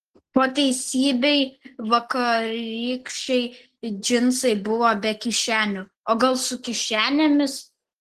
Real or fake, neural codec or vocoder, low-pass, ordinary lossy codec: real; none; 14.4 kHz; Opus, 16 kbps